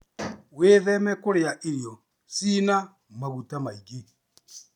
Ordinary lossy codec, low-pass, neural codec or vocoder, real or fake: none; 19.8 kHz; none; real